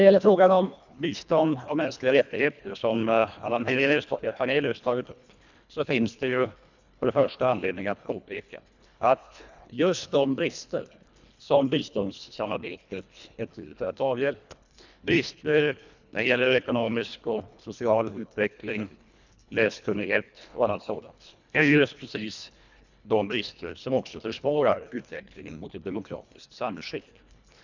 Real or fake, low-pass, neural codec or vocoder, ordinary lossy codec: fake; 7.2 kHz; codec, 24 kHz, 1.5 kbps, HILCodec; none